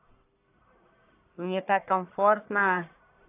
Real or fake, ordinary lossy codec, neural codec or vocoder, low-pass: fake; AAC, 24 kbps; codec, 44.1 kHz, 1.7 kbps, Pupu-Codec; 3.6 kHz